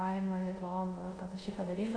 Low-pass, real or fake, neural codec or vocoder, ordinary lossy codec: 9.9 kHz; fake; codec, 24 kHz, 0.5 kbps, DualCodec; AAC, 48 kbps